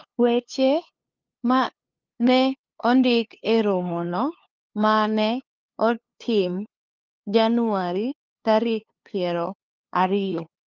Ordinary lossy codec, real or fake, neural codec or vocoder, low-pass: Opus, 24 kbps; fake; codec, 16 kHz, 2 kbps, FunCodec, trained on LibriTTS, 25 frames a second; 7.2 kHz